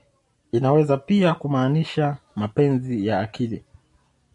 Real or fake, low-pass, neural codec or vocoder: real; 10.8 kHz; none